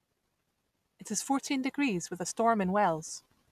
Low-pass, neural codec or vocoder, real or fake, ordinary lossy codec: 14.4 kHz; none; real; none